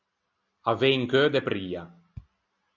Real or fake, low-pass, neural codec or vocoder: real; 7.2 kHz; none